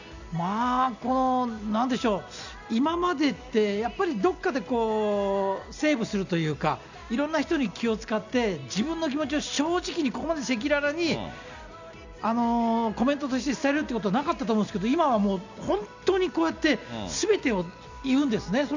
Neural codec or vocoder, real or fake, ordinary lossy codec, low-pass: none; real; none; 7.2 kHz